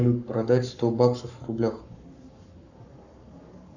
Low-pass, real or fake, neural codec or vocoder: 7.2 kHz; fake; codec, 44.1 kHz, 7.8 kbps, Pupu-Codec